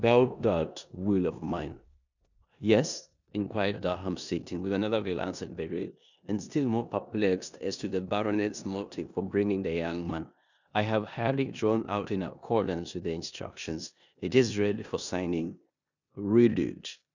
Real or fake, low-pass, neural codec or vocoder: fake; 7.2 kHz; codec, 16 kHz in and 24 kHz out, 0.9 kbps, LongCat-Audio-Codec, four codebook decoder